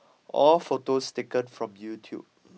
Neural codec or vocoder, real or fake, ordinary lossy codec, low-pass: none; real; none; none